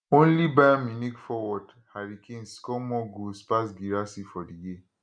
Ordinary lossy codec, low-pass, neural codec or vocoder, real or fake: none; 9.9 kHz; none; real